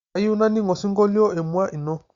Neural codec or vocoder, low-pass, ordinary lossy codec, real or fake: none; 7.2 kHz; Opus, 64 kbps; real